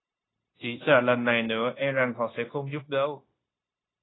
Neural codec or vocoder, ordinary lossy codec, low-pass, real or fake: codec, 16 kHz, 0.9 kbps, LongCat-Audio-Codec; AAC, 16 kbps; 7.2 kHz; fake